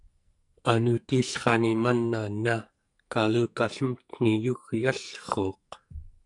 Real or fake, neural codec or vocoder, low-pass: fake; codec, 44.1 kHz, 2.6 kbps, SNAC; 10.8 kHz